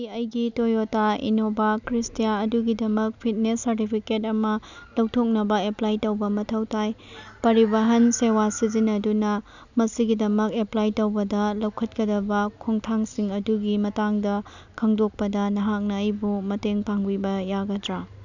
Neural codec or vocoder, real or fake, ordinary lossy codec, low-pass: none; real; none; 7.2 kHz